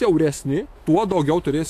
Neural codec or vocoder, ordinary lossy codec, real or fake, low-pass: autoencoder, 48 kHz, 128 numbers a frame, DAC-VAE, trained on Japanese speech; AAC, 64 kbps; fake; 14.4 kHz